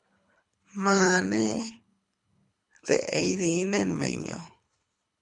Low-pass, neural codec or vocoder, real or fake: 10.8 kHz; codec, 24 kHz, 3 kbps, HILCodec; fake